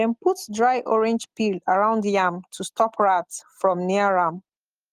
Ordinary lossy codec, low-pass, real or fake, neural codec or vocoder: Opus, 24 kbps; 14.4 kHz; real; none